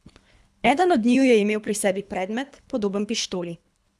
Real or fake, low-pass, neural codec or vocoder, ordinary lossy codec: fake; none; codec, 24 kHz, 3 kbps, HILCodec; none